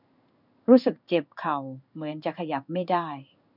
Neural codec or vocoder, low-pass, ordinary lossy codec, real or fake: codec, 16 kHz in and 24 kHz out, 1 kbps, XY-Tokenizer; 5.4 kHz; none; fake